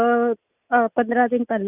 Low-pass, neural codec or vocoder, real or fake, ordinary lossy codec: 3.6 kHz; none; real; none